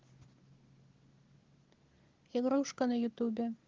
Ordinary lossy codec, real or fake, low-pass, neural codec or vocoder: Opus, 16 kbps; real; 7.2 kHz; none